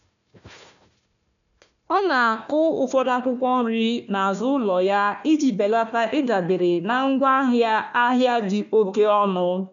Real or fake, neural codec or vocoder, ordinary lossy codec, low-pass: fake; codec, 16 kHz, 1 kbps, FunCodec, trained on Chinese and English, 50 frames a second; none; 7.2 kHz